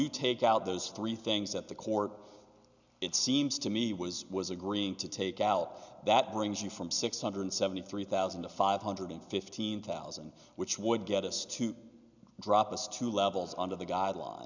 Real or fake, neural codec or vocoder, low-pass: real; none; 7.2 kHz